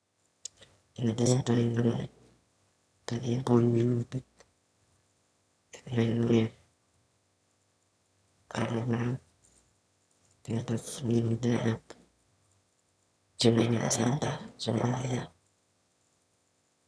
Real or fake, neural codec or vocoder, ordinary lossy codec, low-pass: fake; autoencoder, 22.05 kHz, a latent of 192 numbers a frame, VITS, trained on one speaker; none; none